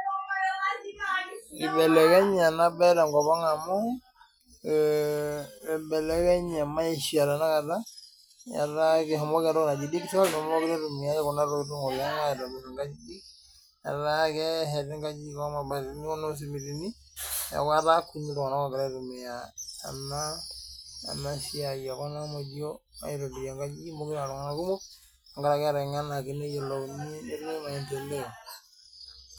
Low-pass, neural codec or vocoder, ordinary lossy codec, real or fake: none; none; none; real